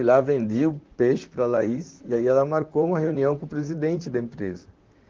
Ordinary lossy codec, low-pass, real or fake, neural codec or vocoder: Opus, 32 kbps; 7.2 kHz; fake; vocoder, 44.1 kHz, 128 mel bands, Pupu-Vocoder